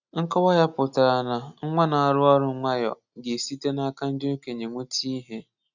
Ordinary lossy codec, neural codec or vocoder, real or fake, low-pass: none; none; real; 7.2 kHz